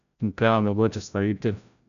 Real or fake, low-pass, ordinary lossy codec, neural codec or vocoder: fake; 7.2 kHz; none; codec, 16 kHz, 0.5 kbps, FreqCodec, larger model